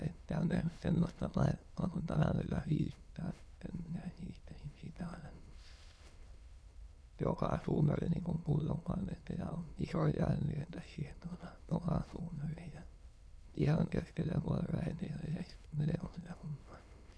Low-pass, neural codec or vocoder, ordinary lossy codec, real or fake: none; autoencoder, 22.05 kHz, a latent of 192 numbers a frame, VITS, trained on many speakers; none; fake